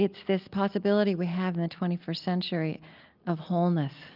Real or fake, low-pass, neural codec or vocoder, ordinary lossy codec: real; 5.4 kHz; none; Opus, 24 kbps